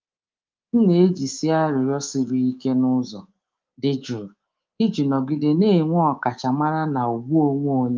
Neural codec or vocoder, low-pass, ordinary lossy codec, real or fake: codec, 24 kHz, 3.1 kbps, DualCodec; 7.2 kHz; Opus, 32 kbps; fake